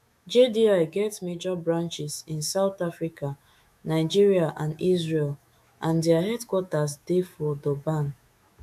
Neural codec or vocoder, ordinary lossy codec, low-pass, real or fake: autoencoder, 48 kHz, 128 numbers a frame, DAC-VAE, trained on Japanese speech; MP3, 96 kbps; 14.4 kHz; fake